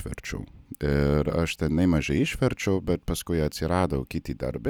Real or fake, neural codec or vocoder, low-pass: real; none; 19.8 kHz